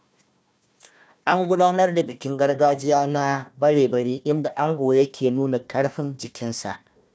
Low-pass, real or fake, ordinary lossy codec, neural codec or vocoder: none; fake; none; codec, 16 kHz, 1 kbps, FunCodec, trained on Chinese and English, 50 frames a second